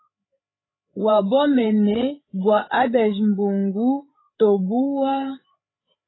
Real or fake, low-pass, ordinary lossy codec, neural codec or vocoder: fake; 7.2 kHz; AAC, 16 kbps; codec, 16 kHz, 8 kbps, FreqCodec, larger model